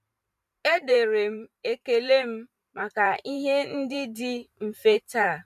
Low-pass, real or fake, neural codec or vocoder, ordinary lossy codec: 14.4 kHz; fake; vocoder, 44.1 kHz, 128 mel bands every 512 samples, BigVGAN v2; none